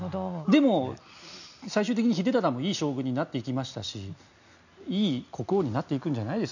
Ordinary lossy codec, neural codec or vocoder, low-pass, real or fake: none; none; 7.2 kHz; real